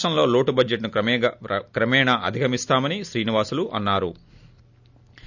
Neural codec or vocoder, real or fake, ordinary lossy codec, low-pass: none; real; none; 7.2 kHz